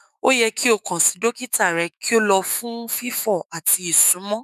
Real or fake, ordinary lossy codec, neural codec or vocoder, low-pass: fake; none; autoencoder, 48 kHz, 128 numbers a frame, DAC-VAE, trained on Japanese speech; 14.4 kHz